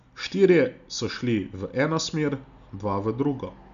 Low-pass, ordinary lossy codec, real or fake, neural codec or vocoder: 7.2 kHz; none; real; none